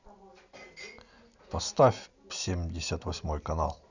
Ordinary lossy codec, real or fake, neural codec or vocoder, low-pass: none; real; none; 7.2 kHz